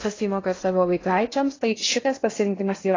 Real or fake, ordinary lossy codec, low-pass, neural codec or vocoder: fake; AAC, 32 kbps; 7.2 kHz; codec, 16 kHz in and 24 kHz out, 0.6 kbps, FocalCodec, streaming, 2048 codes